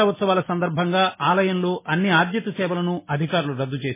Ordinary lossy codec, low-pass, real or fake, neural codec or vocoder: MP3, 16 kbps; 3.6 kHz; real; none